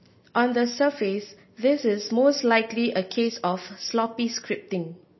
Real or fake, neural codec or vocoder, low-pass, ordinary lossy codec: real; none; 7.2 kHz; MP3, 24 kbps